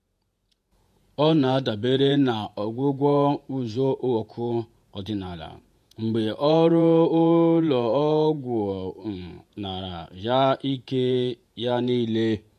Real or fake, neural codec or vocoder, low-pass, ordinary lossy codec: fake; vocoder, 48 kHz, 128 mel bands, Vocos; 14.4 kHz; MP3, 64 kbps